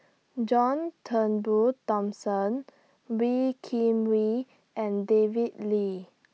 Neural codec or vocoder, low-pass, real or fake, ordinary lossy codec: none; none; real; none